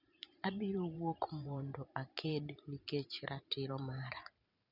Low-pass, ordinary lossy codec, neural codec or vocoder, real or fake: 5.4 kHz; none; vocoder, 44.1 kHz, 128 mel bands every 512 samples, BigVGAN v2; fake